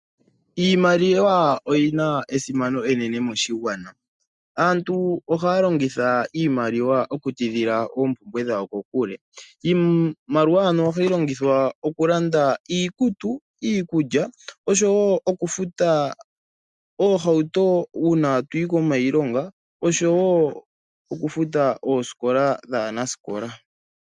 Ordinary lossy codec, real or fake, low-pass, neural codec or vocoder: MP3, 96 kbps; real; 10.8 kHz; none